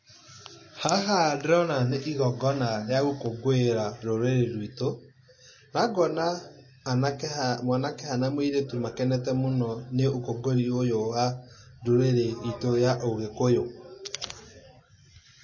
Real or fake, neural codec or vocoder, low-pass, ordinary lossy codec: real; none; 7.2 kHz; MP3, 32 kbps